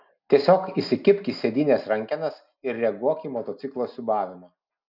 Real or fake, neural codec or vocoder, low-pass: real; none; 5.4 kHz